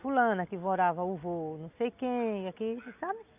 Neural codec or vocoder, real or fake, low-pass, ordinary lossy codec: none; real; 3.6 kHz; AAC, 32 kbps